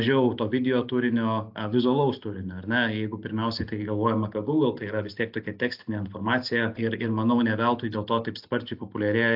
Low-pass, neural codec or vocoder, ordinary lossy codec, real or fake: 5.4 kHz; none; AAC, 48 kbps; real